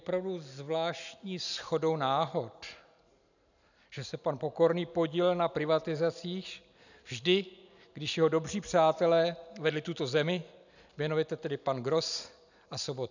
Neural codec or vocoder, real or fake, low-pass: none; real; 7.2 kHz